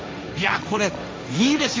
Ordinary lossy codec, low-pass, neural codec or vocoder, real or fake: none; none; codec, 16 kHz, 1.1 kbps, Voila-Tokenizer; fake